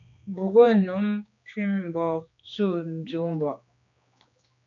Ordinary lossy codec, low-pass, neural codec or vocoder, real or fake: AAC, 64 kbps; 7.2 kHz; codec, 16 kHz, 2 kbps, X-Codec, HuBERT features, trained on general audio; fake